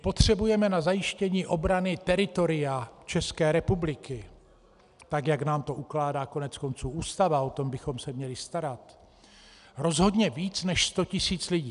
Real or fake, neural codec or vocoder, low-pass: real; none; 10.8 kHz